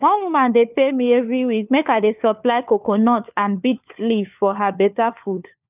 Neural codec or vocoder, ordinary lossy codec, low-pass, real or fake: codec, 16 kHz, 4 kbps, FunCodec, trained on LibriTTS, 50 frames a second; none; 3.6 kHz; fake